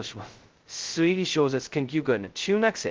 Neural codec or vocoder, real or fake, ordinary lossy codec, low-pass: codec, 16 kHz, 0.2 kbps, FocalCodec; fake; Opus, 16 kbps; 7.2 kHz